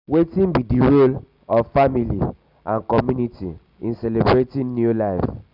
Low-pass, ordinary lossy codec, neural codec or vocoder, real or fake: 5.4 kHz; none; none; real